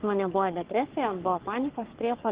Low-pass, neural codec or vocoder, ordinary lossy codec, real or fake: 3.6 kHz; codec, 44.1 kHz, 3.4 kbps, Pupu-Codec; Opus, 16 kbps; fake